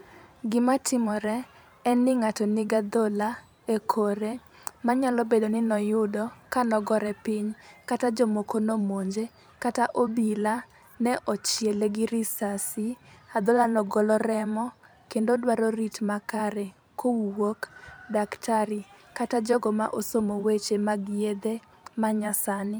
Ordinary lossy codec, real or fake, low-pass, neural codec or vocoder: none; fake; none; vocoder, 44.1 kHz, 128 mel bands every 512 samples, BigVGAN v2